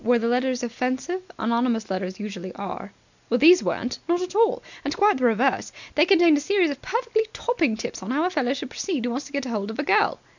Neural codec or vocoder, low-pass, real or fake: none; 7.2 kHz; real